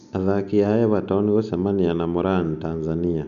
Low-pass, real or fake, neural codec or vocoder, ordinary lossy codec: 7.2 kHz; real; none; none